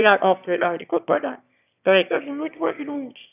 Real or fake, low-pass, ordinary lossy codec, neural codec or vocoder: fake; 3.6 kHz; none; autoencoder, 22.05 kHz, a latent of 192 numbers a frame, VITS, trained on one speaker